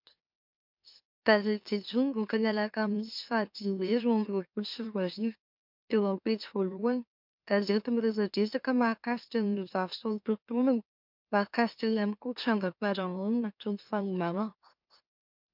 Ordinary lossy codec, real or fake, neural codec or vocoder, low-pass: MP3, 48 kbps; fake; autoencoder, 44.1 kHz, a latent of 192 numbers a frame, MeloTTS; 5.4 kHz